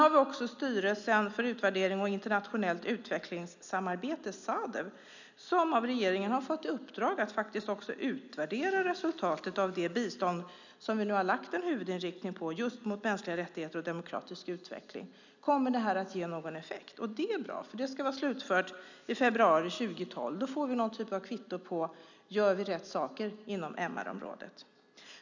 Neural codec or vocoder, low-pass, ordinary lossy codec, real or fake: none; 7.2 kHz; none; real